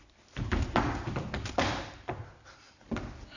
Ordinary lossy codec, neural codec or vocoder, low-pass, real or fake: none; none; 7.2 kHz; real